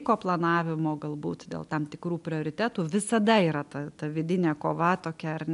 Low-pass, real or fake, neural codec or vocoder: 10.8 kHz; real; none